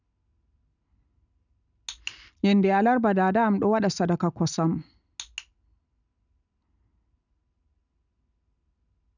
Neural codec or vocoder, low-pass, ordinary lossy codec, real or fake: none; 7.2 kHz; none; real